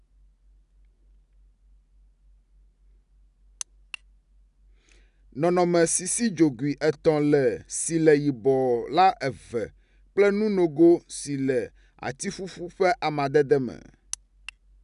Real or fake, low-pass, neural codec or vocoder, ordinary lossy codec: real; 10.8 kHz; none; none